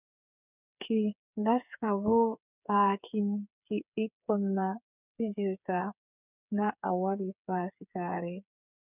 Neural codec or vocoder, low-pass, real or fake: codec, 16 kHz, 8 kbps, FreqCodec, smaller model; 3.6 kHz; fake